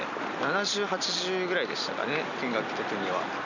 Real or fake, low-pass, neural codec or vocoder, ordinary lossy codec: real; 7.2 kHz; none; none